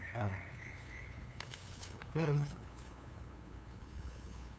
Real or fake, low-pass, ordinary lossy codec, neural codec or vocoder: fake; none; none; codec, 16 kHz, 2 kbps, FunCodec, trained on LibriTTS, 25 frames a second